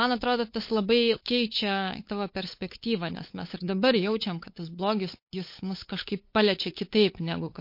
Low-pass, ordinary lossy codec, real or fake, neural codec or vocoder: 5.4 kHz; MP3, 32 kbps; fake; codec, 16 kHz, 8 kbps, FunCodec, trained on LibriTTS, 25 frames a second